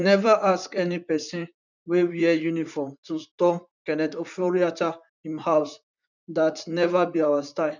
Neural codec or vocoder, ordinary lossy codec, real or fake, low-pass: vocoder, 44.1 kHz, 128 mel bands, Pupu-Vocoder; none; fake; 7.2 kHz